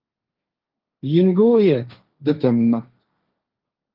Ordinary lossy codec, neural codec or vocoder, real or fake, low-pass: Opus, 24 kbps; codec, 16 kHz, 1.1 kbps, Voila-Tokenizer; fake; 5.4 kHz